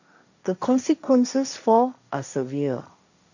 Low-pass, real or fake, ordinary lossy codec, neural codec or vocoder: 7.2 kHz; fake; none; codec, 16 kHz, 1.1 kbps, Voila-Tokenizer